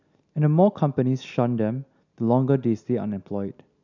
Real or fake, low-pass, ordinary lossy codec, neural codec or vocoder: real; 7.2 kHz; none; none